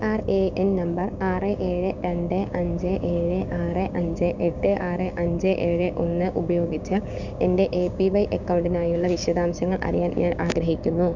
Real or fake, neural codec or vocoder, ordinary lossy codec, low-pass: fake; codec, 16 kHz, 6 kbps, DAC; none; 7.2 kHz